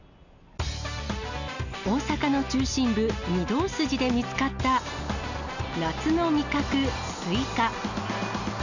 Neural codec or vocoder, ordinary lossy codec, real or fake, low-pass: none; none; real; 7.2 kHz